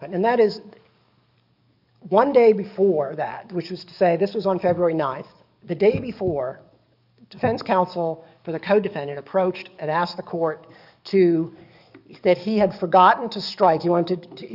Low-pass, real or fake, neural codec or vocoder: 5.4 kHz; fake; codec, 44.1 kHz, 7.8 kbps, DAC